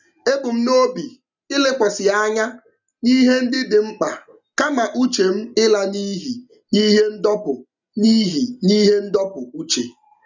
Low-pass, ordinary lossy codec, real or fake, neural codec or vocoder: 7.2 kHz; none; real; none